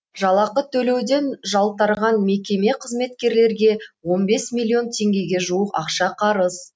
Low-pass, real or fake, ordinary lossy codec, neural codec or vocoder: none; real; none; none